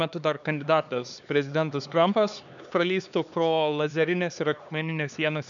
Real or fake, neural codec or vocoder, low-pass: fake; codec, 16 kHz, 4 kbps, X-Codec, HuBERT features, trained on LibriSpeech; 7.2 kHz